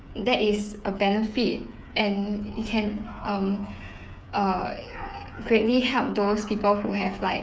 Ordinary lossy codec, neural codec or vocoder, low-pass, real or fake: none; codec, 16 kHz, 8 kbps, FreqCodec, smaller model; none; fake